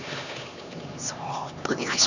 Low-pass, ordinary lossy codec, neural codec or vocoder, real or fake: 7.2 kHz; none; codec, 16 kHz, 2 kbps, X-Codec, HuBERT features, trained on LibriSpeech; fake